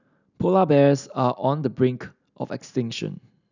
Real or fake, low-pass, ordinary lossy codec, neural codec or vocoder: real; 7.2 kHz; none; none